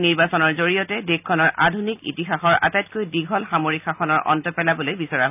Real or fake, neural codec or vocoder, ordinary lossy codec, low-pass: real; none; none; 3.6 kHz